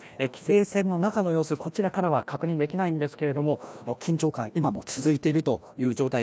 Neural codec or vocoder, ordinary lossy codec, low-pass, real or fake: codec, 16 kHz, 1 kbps, FreqCodec, larger model; none; none; fake